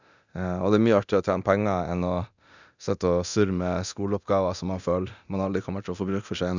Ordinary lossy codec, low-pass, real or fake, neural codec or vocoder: none; 7.2 kHz; fake; codec, 24 kHz, 0.9 kbps, DualCodec